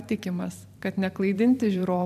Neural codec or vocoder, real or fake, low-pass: vocoder, 44.1 kHz, 128 mel bands every 512 samples, BigVGAN v2; fake; 14.4 kHz